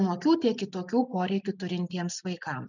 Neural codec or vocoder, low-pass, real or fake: none; 7.2 kHz; real